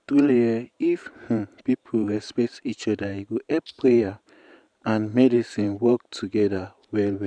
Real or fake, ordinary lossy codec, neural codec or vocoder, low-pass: fake; none; vocoder, 44.1 kHz, 128 mel bands every 512 samples, BigVGAN v2; 9.9 kHz